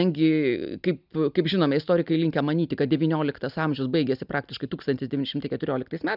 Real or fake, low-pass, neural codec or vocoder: real; 5.4 kHz; none